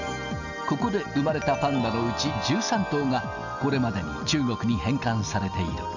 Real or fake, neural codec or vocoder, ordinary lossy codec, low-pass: real; none; none; 7.2 kHz